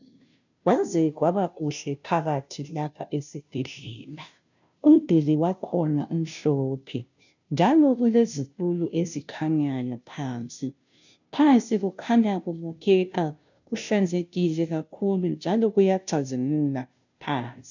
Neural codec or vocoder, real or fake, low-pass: codec, 16 kHz, 0.5 kbps, FunCodec, trained on Chinese and English, 25 frames a second; fake; 7.2 kHz